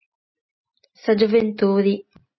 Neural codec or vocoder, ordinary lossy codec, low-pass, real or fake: none; MP3, 24 kbps; 7.2 kHz; real